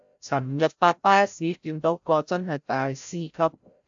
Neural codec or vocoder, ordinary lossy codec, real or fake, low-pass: codec, 16 kHz, 0.5 kbps, FreqCodec, larger model; AAC, 64 kbps; fake; 7.2 kHz